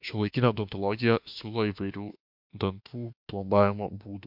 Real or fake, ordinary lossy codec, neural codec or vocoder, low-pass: fake; MP3, 48 kbps; autoencoder, 48 kHz, 32 numbers a frame, DAC-VAE, trained on Japanese speech; 5.4 kHz